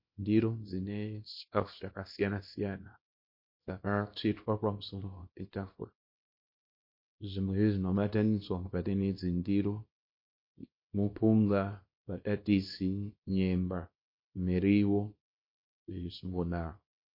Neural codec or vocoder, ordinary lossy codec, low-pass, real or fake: codec, 24 kHz, 0.9 kbps, WavTokenizer, small release; MP3, 32 kbps; 5.4 kHz; fake